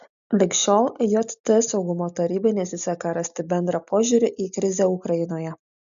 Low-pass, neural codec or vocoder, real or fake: 7.2 kHz; none; real